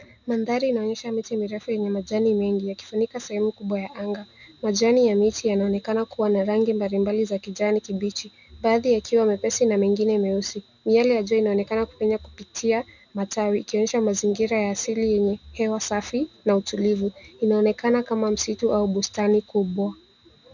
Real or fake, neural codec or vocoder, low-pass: real; none; 7.2 kHz